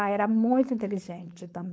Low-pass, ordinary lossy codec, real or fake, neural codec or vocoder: none; none; fake; codec, 16 kHz, 4.8 kbps, FACodec